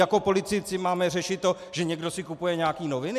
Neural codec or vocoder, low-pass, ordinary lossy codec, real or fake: none; 14.4 kHz; MP3, 96 kbps; real